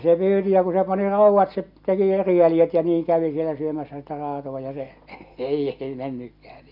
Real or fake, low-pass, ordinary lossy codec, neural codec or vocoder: real; 5.4 kHz; none; none